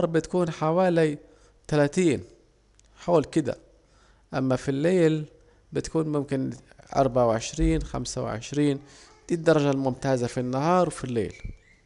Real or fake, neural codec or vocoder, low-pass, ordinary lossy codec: real; none; 10.8 kHz; none